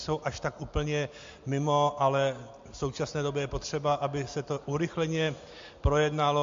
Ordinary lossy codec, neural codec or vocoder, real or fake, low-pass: MP3, 48 kbps; none; real; 7.2 kHz